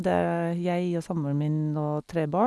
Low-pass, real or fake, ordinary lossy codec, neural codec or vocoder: none; real; none; none